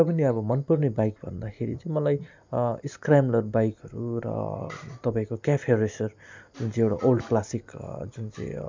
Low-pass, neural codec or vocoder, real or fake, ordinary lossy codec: 7.2 kHz; none; real; MP3, 64 kbps